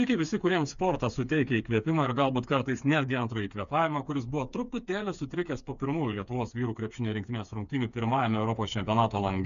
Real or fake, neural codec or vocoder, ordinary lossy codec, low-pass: fake; codec, 16 kHz, 4 kbps, FreqCodec, smaller model; MP3, 96 kbps; 7.2 kHz